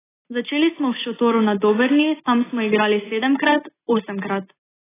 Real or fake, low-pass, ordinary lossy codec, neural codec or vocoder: real; 3.6 kHz; AAC, 16 kbps; none